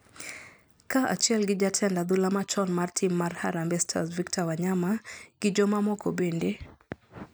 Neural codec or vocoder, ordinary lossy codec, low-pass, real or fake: none; none; none; real